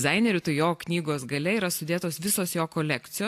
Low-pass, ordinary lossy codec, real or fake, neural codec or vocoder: 14.4 kHz; AAC, 64 kbps; real; none